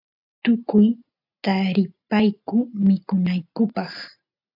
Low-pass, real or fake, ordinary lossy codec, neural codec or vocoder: 5.4 kHz; real; AAC, 48 kbps; none